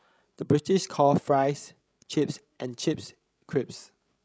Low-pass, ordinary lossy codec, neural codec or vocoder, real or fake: none; none; codec, 16 kHz, 16 kbps, FreqCodec, larger model; fake